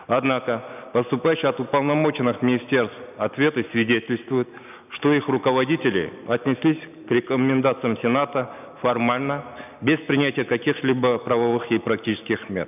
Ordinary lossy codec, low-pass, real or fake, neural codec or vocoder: none; 3.6 kHz; real; none